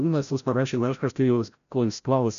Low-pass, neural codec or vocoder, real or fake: 7.2 kHz; codec, 16 kHz, 0.5 kbps, FreqCodec, larger model; fake